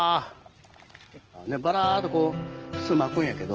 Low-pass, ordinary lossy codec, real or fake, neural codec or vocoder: 7.2 kHz; Opus, 16 kbps; real; none